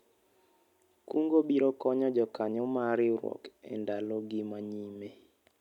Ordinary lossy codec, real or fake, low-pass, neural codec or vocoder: none; real; 19.8 kHz; none